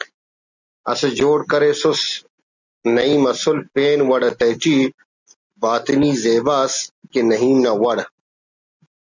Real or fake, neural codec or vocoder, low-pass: real; none; 7.2 kHz